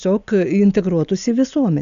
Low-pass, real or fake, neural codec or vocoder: 7.2 kHz; real; none